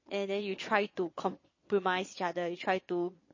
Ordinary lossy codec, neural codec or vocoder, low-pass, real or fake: MP3, 32 kbps; vocoder, 22.05 kHz, 80 mel bands, Vocos; 7.2 kHz; fake